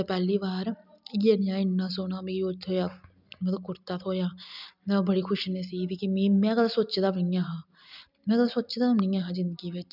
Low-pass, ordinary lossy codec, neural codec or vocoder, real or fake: 5.4 kHz; none; none; real